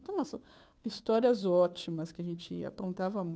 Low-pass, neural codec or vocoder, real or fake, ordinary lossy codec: none; codec, 16 kHz, 2 kbps, FunCodec, trained on Chinese and English, 25 frames a second; fake; none